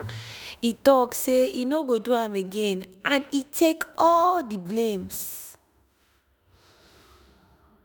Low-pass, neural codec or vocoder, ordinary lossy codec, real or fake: none; autoencoder, 48 kHz, 32 numbers a frame, DAC-VAE, trained on Japanese speech; none; fake